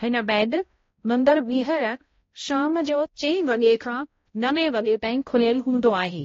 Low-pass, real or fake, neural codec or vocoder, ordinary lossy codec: 7.2 kHz; fake; codec, 16 kHz, 0.5 kbps, X-Codec, HuBERT features, trained on balanced general audio; AAC, 32 kbps